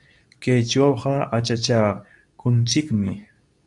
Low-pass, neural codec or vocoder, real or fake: 10.8 kHz; codec, 24 kHz, 0.9 kbps, WavTokenizer, medium speech release version 2; fake